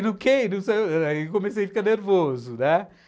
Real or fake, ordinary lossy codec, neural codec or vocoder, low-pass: real; none; none; none